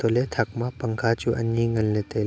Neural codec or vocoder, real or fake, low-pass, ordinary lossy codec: none; real; none; none